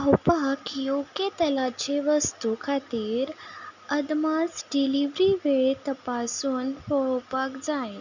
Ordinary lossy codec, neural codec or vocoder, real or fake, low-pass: none; none; real; 7.2 kHz